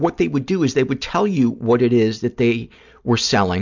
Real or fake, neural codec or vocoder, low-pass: real; none; 7.2 kHz